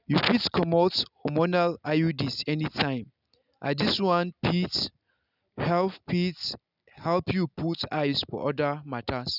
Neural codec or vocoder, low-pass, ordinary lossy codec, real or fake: none; 5.4 kHz; none; real